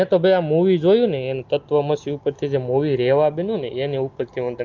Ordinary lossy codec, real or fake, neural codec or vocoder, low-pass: Opus, 32 kbps; real; none; 7.2 kHz